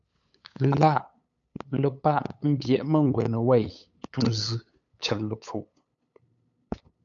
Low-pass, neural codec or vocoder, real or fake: 7.2 kHz; codec, 16 kHz, 8 kbps, FunCodec, trained on LibriTTS, 25 frames a second; fake